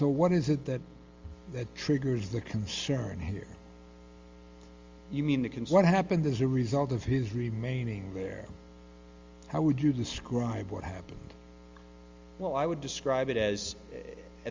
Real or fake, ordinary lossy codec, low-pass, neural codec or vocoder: real; Opus, 32 kbps; 7.2 kHz; none